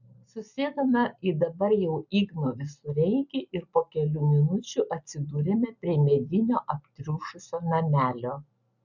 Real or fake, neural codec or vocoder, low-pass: real; none; 7.2 kHz